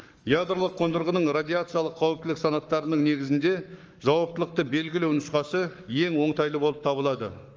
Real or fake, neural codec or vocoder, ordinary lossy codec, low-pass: fake; codec, 44.1 kHz, 7.8 kbps, Pupu-Codec; Opus, 24 kbps; 7.2 kHz